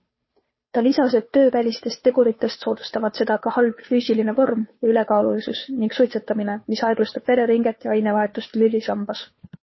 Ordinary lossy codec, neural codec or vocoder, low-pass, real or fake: MP3, 24 kbps; codec, 16 kHz, 2 kbps, FunCodec, trained on Chinese and English, 25 frames a second; 7.2 kHz; fake